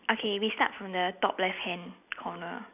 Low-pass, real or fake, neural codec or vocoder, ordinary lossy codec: 3.6 kHz; real; none; none